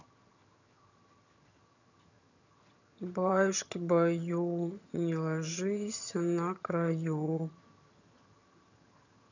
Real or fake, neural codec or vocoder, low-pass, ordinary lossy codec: fake; vocoder, 22.05 kHz, 80 mel bands, HiFi-GAN; 7.2 kHz; none